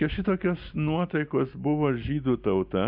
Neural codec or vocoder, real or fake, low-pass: none; real; 5.4 kHz